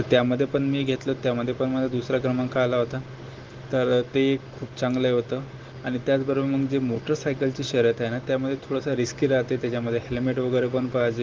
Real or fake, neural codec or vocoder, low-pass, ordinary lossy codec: real; none; 7.2 kHz; Opus, 16 kbps